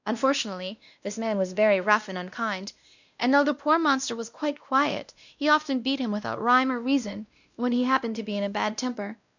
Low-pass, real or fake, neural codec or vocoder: 7.2 kHz; fake; codec, 16 kHz, 1 kbps, X-Codec, WavLM features, trained on Multilingual LibriSpeech